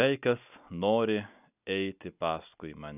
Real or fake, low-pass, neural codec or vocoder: real; 3.6 kHz; none